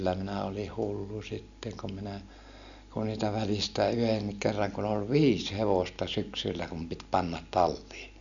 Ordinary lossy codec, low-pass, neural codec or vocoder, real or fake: none; 7.2 kHz; none; real